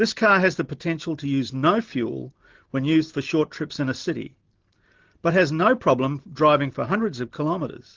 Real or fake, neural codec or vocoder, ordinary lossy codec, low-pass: real; none; Opus, 16 kbps; 7.2 kHz